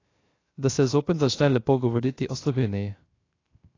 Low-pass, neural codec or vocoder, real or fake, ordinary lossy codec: 7.2 kHz; codec, 16 kHz, 0.3 kbps, FocalCodec; fake; AAC, 32 kbps